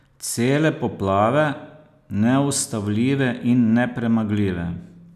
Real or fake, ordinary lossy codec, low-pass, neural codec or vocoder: real; none; 14.4 kHz; none